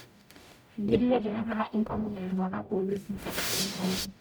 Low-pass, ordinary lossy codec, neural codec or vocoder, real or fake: none; none; codec, 44.1 kHz, 0.9 kbps, DAC; fake